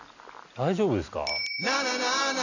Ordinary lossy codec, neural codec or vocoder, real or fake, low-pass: none; none; real; 7.2 kHz